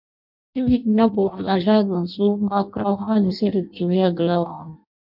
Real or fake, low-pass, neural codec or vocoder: fake; 5.4 kHz; codec, 16 kHz in and 24 kHz out, 0.6 kbps, FireRedTTS-2 codec